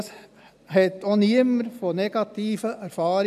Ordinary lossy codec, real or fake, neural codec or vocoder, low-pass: none; real; none; 14.4 kHz